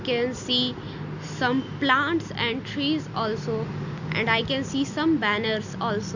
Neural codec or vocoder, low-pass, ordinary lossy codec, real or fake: none; 7.2 kHz; none; real